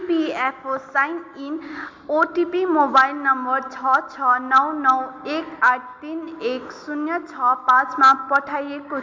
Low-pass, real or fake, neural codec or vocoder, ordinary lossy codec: 7.2 kHz; real; none; MP3, 64 kbps